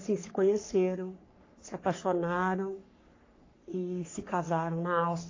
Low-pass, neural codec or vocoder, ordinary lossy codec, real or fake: 7.2 kHz; codec, 44.1 kHz, 3.4 kbps, Pupu-Codec; AAC, 32 kbps; fake